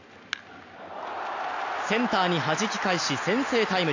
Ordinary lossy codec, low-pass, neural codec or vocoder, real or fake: AAC, 48 kbps; 7.2 kHz; none; real